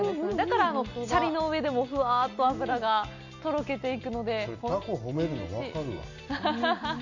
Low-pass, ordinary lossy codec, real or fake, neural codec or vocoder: 7.2 kHz; none; real; none